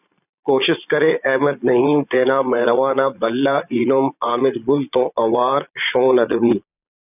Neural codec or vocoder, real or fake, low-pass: vocoder, 22.05 kHz, 80 mel bands, Vocos; fake; 3.6 kHz